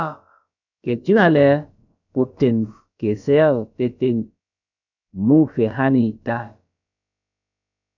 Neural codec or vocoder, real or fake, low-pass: codec, 16 kHz, about 1 kbps, DyCAST, with the encoder's durations; fake; 7.2 kHz